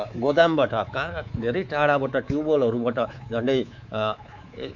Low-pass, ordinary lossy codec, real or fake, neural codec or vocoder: 7.2 kHz; none; fake; codec, 24 kHz, 3.1 kbps, DualCodec